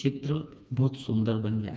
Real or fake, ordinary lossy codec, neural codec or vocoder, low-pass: fake; none; codec, 16 kHz, 2 kbps, FreqCodec, smaller model; none